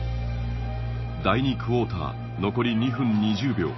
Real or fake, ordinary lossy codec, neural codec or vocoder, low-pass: real; MP3, 24 kbps; none; 7.2 kHz